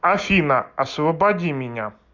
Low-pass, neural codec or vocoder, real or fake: 7.2 kHz; none; real